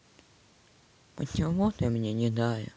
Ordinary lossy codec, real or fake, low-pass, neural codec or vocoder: none; real; none; none